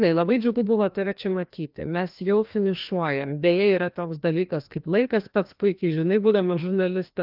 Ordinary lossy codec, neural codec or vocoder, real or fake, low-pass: Opus, 24 kbps; codec, 16 kHz, 1 kbps, FreqCodec, larger model; fake; 5.4 kHz